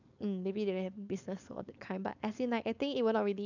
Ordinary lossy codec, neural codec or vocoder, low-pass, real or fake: none; codec, 16 kHz, 4.8 kbps, FACodec; 7.2 kHz; fake